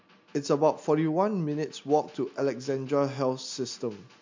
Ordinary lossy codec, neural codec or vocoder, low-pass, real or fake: MP3, 48 kbps; none; 7.2 kHz; real